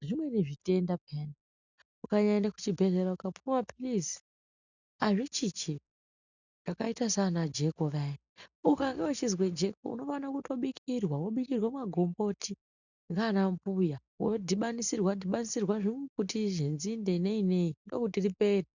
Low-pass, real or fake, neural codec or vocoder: 7.2 kHz; real; none